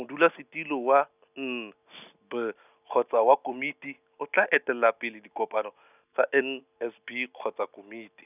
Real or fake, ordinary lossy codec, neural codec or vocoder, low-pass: real; none; none; 3.6 kHz